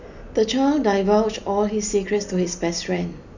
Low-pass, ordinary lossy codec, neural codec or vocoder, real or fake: 7.2 kHz; none; none; real